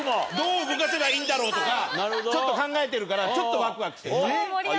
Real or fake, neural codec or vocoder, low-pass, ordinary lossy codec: real; none; none; none